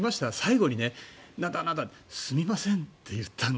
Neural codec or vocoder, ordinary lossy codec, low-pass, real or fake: none; none; none; real